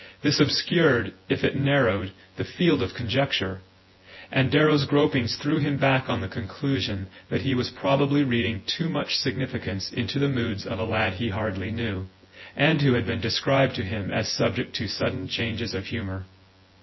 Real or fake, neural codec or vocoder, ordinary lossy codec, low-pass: fake; vocoder, 24 kHz, 100 mel bands, Vocos; MP3, 24 kbps; 7.2 kHz